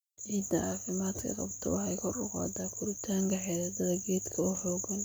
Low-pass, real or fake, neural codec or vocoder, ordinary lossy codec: none; real; none; none